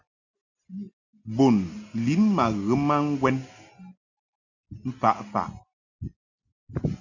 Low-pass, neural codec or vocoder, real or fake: 7.2 kHz; none; real